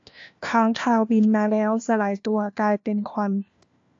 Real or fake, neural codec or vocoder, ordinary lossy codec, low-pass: fake; codec, 16 kHz, 1 kbps, FunCodec, trained on LibriTTS, 50 frames a second; AAC, 48 kbps; 7.2 kHz